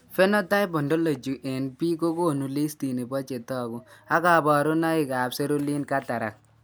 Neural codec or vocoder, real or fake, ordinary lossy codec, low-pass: none; real; none; none